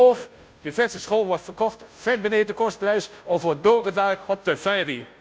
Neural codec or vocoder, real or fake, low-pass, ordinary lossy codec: codec, 16 kHz, 0.5 kbps, FunCodec, trained on Chinese and English, 25 frames a second; fake; none; none